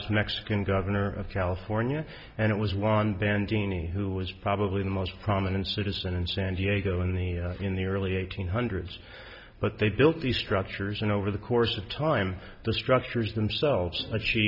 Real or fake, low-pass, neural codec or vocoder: real; 5.4 kHz; none